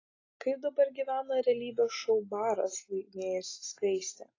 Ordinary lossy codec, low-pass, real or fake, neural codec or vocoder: AAC, 32 kbps; 7.2 kHz; real; none